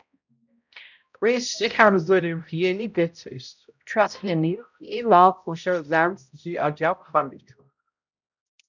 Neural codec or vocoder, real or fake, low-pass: codec, 16 kHz, 0.5 kbps, X-Codec, HuBERT features, trained on balanced general audio; fake; 7.2 kHz